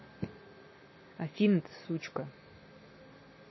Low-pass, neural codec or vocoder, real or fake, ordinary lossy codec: 7.2 kHz; none; real; MP3, 24 kbps